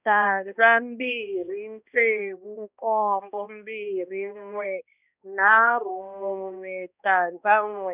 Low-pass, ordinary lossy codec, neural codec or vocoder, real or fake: 3.6 kHz; none; codec, 16 kHz, 1 kbps, X-Codec, HuBERT features, trained on balanced general audio; fake